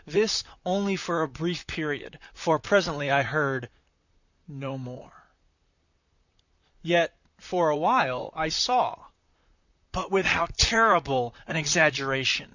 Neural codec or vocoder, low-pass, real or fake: vocoder, 44.1 kHz, 128 mel bands, Pupu-Vocoder; 7.2 kHz; fake